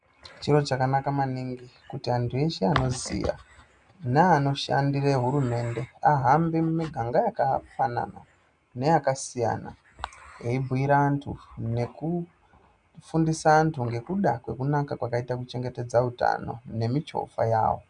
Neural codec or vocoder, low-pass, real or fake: none; 9.9 kHz; real